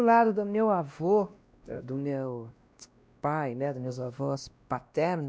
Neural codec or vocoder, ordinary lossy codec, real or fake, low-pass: codec, 16 kHz, 1 kbps, X-Codec, WavLM features, trained on Multilingual LibriSpeech; none; fake; none